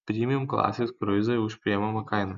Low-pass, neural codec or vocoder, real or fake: 7.2 kHz; none; real